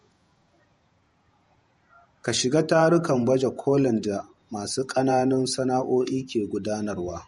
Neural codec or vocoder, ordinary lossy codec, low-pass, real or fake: vocoder, 48 kHz, 128 mel bands, Vocos; MP3, 48 kbps; 19.8 kHz; fake